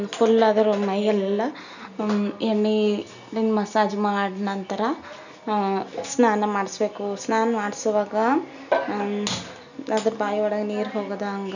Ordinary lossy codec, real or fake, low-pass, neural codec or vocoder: none; real; 7.2 kHz; none